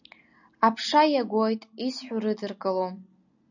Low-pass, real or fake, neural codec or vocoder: 7.2 kHz; real; none